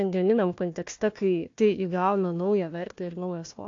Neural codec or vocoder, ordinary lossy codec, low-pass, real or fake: codec, 16 kHz, 1 kbps, FunCodec, trained on Chinese and English, 50 frames a second; AAC, 48 kbps; 7.2 kHz; fake